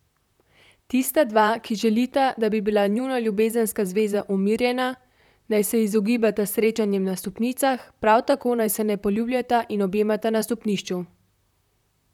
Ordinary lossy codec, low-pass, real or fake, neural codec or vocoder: none; 19.8 kHz; fake; vocoder, 44.1 kHz, 128 mel bands, Pupu-Vocoder